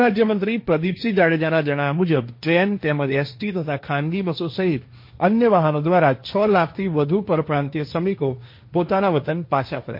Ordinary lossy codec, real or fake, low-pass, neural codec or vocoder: MP3, 32 kbps; fake; 5.4 kHz; codec, 16 kHz, 1.1 kbps, Voila-Tokenizer